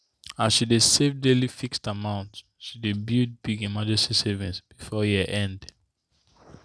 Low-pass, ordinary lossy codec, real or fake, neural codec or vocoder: none; none; real; none